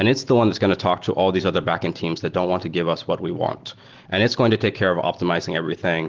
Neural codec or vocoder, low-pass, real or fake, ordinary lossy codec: none; 7.2 kHz; real; Opus, 16 kbps